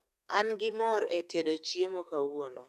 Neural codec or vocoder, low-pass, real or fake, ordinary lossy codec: codec, 32 kHz, 1.9 kbps, SNAC; 14.4 kHz; fake; none